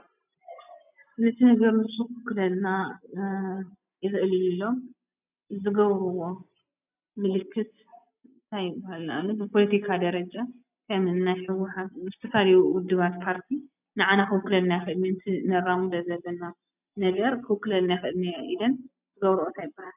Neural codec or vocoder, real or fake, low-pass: none; real; 3.6 kHz